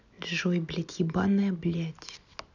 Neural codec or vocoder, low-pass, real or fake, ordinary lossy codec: none; 7.2 kHz; real; none